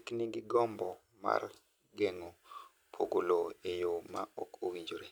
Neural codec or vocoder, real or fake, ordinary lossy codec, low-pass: none; real; none; none